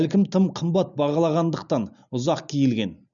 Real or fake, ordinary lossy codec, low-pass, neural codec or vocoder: real; none; 7.2 kHz; none